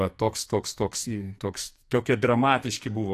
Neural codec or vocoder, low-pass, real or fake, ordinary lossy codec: codec, 44.1 kHz, 2.6 kbps, SNAC; 14.4 kHz; fake; AAC, 64 kbps